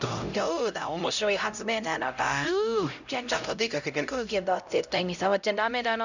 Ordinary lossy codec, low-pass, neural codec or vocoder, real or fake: none; 7.2 kHz; codec, 16 kHz, 0.5 kbps, X-Codec, HuBERT features, trained on LibriSpeech; fake